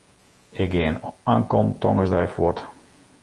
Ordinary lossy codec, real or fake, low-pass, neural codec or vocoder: Opus, 24 kbps; fake; 10.8 kHz; vocoder, 48 kHz, 128 mel bands, Vocos